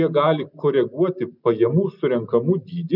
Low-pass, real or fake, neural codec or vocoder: 5.4 kHz; real; none